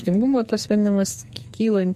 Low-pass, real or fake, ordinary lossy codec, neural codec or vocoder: 14.4 kHz; fake; MP3, 64 kbps; codec, 44.1 kHz, 2.6 kbps, SNAC